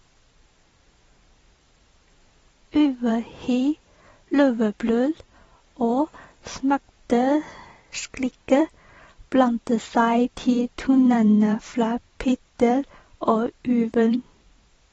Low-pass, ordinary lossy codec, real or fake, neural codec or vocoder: 19.8 kHz; AAC, 24 kbps; fake; vocoder, 44.1 kHz, 128 mel bands every 512 samples, BigVGAN v2